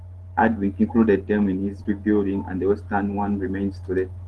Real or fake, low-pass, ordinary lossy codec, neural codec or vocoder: real; 10.8 kHz; Opus, 16 kbps; none